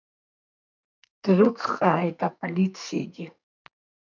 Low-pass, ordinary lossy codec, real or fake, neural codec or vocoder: 7.2 kHz; MP3, 64 kbps; fake; codec, 44.1 kHz, 2.6 kbps, SNAC